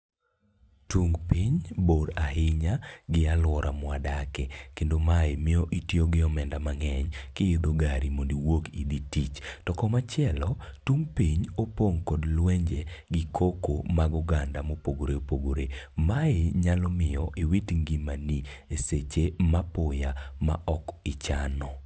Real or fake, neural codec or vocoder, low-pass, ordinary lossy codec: real; none; none; none